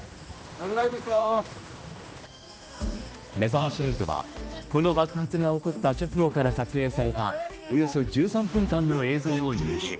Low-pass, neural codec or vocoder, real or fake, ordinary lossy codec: none; codec, 16 kHz, 1 kbps, X-Codec, HuBERT features, trained on general audio; fake; none